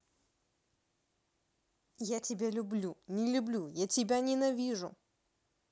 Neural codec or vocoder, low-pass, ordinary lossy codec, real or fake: none; none; none; real